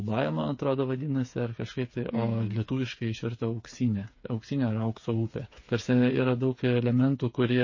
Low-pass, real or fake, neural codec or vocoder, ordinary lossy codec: 7.2 kHz; fake; codec, 16 kHz, 8 kbps, FreqCodec, smaller model; MP3, 32 kbps